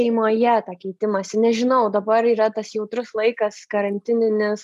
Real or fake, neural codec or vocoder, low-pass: real; none; 14.4 kHz